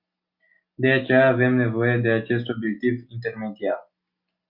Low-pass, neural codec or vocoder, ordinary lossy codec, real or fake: 5.4 kHz; none; Opus, 64 kbps; real